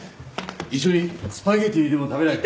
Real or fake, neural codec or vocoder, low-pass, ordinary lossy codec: real; none; none; none